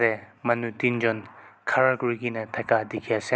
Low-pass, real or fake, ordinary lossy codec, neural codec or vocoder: none; real; none; none